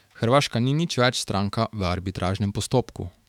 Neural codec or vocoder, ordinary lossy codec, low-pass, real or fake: autoencoder, 48 kHz, 128 numbers a frame, DAC-VAE, trained on Japanese speech; none; 19.8 kHz; fake